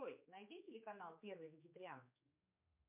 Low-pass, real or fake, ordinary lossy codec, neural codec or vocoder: 3.6 kHz; fake; MP3, 32 kbps; codec, 16 kHz, 4 kbps, X-Codec, HuBERT features, trained on general audio